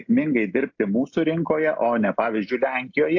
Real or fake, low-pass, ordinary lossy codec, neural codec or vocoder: real; 7.2 kHz; AAC, 48 kbps; none